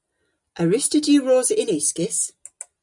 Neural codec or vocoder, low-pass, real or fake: none; 10.8 kHz; real